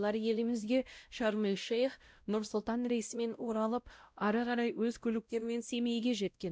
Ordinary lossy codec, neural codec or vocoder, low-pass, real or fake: none; codec, 16 kHz, 0.5 kbps, X-Codec, WavLM features, trained on Multilingual LibriSpeech; none; fake